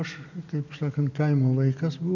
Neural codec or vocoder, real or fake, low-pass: none; real; 7.2 kHz